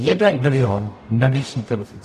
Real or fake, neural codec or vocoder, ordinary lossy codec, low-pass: fake; codec, 44.1 kHz, 0.9 kbps, DAC; AAC, 64 kbps; 14.4 kHz